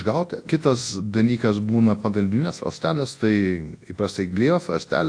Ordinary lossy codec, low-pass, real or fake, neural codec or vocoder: AAC, 48 kbps; 9.9 kHz; fake; codec, 24 kHz, 0.9 kbps, WavTokenizer, large speech release